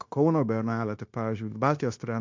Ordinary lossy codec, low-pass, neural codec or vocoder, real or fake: MP3, 48 kbps; 7.2 kHz; codec, 16 kHz, 0.9 kbps, LongCat-Audio-Codec; fake